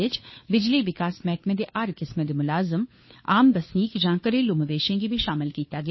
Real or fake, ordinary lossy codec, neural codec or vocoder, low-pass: fake; MP3, 24 kbps; codec, 16 kHz, 2 kbps, FunCodec, trained on Chinese and English, 25 frames a second; 7.2 kHz